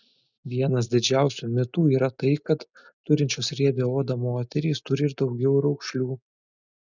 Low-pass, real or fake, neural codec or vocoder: 7.2 kHz; real; none